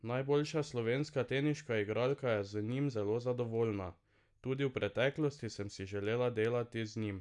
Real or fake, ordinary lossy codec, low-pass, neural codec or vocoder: real; MP3, 96 kbps; 10.8 kHz; none